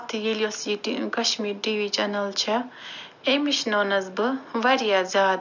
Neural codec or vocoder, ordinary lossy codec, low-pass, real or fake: none; none; 7.2 kHz; real